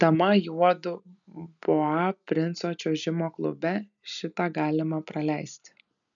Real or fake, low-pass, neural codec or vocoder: real; 7.2 kHz; none